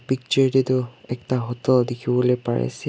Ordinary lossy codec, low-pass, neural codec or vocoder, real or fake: none; none; none; real